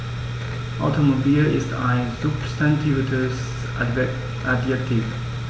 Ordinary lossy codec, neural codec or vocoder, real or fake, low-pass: none; none; real; none